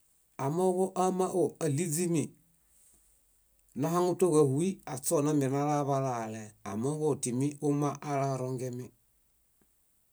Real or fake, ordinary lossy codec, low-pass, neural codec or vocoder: real; none; none; none